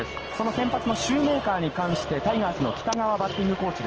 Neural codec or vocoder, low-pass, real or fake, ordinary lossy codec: none; 7.2 kHz; real; Opus, 16 kbps